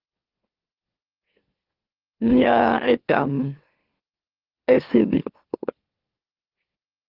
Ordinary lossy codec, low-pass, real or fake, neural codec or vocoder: Opus, 16 kbps; 5.4 kHz; fake; autoencoder, 44.1 kHz, a latent of 192 numbers a frame, MeloTTS